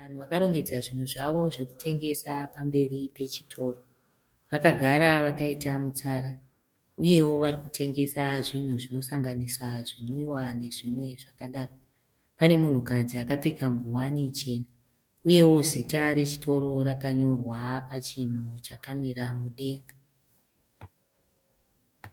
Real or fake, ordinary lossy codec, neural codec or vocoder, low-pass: fake; MP3, 96 kbps; codec, 44.1 kHz, 2.6 kbps, DAC; 19.8 kHz